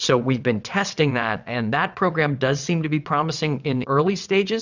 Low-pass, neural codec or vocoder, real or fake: 7.2 kHz; vocoder, 22.05 kHz, 80 mel bands, WaveNeXt; fake